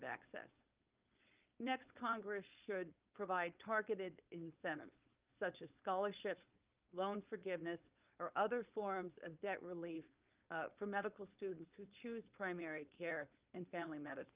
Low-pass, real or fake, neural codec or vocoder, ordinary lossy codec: 3.6 kHz; fake; codec, 16 kHz, 4.8 kbps, FACodec; Opus, 24 kbps